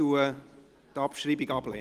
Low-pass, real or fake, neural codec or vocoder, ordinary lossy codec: 14.4 kHz; real; none; Opus, 24 kbps